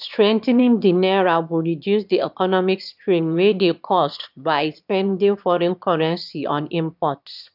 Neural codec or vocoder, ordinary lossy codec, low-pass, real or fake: autoencoder, 22.05 kHz, a latent of 192 numbers a frame, VITS, trained on one speaker; none; 5.4 kHz; fake